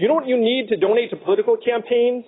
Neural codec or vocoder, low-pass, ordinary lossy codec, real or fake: none; 7.2 kHz; AAC, 16 kbps; real